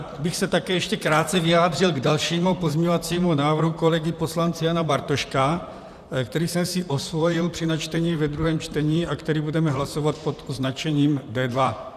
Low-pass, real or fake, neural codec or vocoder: 14.4 kHz; fake; vocoder, 44.1 kHz, 128 mel bands, Pupu-Vocoder